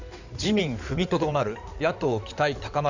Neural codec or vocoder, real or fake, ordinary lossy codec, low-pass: codec, 16 kHz in and 24 kHz out, 2.2 kbps, FireRedTTS-2 codec; fake; none; 7.2 kHz